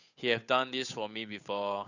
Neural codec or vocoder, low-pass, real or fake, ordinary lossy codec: codec, 16 kHz, 8 kbps, FunCodec, trained on Chinese and English, 25 frames a second; 7.2 kHz; fake; none